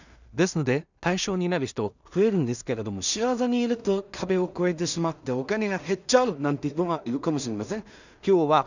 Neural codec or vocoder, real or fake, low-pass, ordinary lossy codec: codec, 16 kHz in and 24 kHz out, 0.4 kbps, LongCat-Audio-Codec, two codebook decoder; fake; 7.2 kHz; none